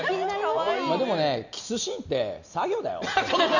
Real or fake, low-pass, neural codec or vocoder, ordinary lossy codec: real; 7.2 kHz; none; none